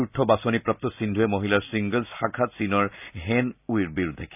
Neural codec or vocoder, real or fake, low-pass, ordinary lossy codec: none; real; 3.6 kHz; none